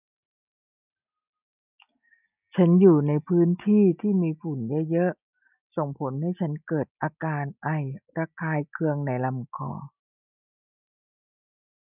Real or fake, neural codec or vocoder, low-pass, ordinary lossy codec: real; none; 3.6 kHz; none